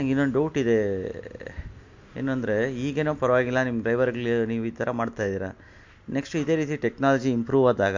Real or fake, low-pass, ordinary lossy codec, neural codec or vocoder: real; 7.2 kHz; MP3, 48 kbps; none